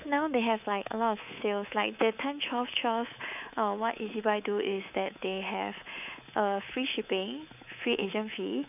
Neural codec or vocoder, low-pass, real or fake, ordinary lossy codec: codec, 24 kHz, 3.1 kbps, DualCodec; 3.6 kHz; fake; none